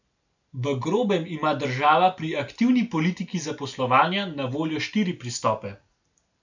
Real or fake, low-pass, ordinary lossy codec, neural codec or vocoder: real; 7.2 kHz; none; none